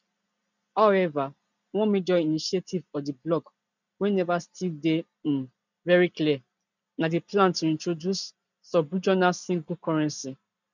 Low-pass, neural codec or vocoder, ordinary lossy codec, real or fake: 7.2 kHz; none; none; real